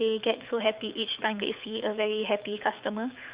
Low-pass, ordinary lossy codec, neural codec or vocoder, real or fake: 3.6 kHz; Opus, 64 kbps; codec, 24 kHz, 6 kbps, HILCodec; fake